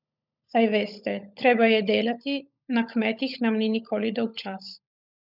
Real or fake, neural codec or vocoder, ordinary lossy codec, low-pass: fake; codec, 16 kHz, 16 kbps, FunCodec, trained on LibriTTS, 50 frames a second; none; 5.4 kHz